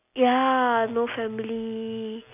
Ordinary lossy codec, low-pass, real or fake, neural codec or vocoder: none; 3.6 kHz; real; none